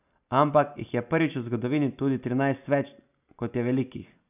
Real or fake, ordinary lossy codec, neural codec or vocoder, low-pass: real; none; none; 3.6 kHz